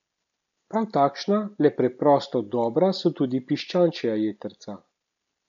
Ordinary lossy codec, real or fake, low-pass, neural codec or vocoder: none; real; 7.2 kHz; none